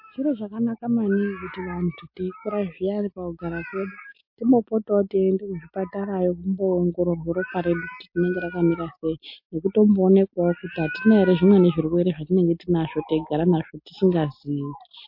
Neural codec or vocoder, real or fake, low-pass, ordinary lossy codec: none; real; 5.4 kHz; MP3, 32 kbps